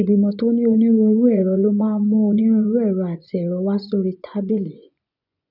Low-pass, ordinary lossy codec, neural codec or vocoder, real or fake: 5.4 kHz; none; vocoder, 24 kHz, 100 mel bands, Vocos; fake